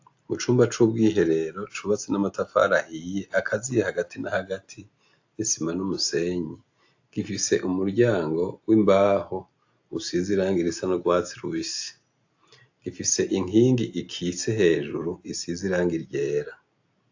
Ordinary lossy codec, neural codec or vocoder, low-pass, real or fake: AAC, 48 kbps; none; 7.2 kHz; real